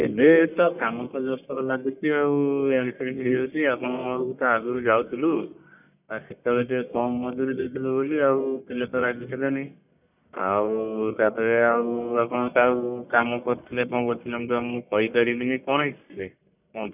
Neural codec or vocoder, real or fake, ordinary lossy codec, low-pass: codec, 44.1 kHz, 1.7 kbps, Pupu-Codec; fake; none; 3.6 kHz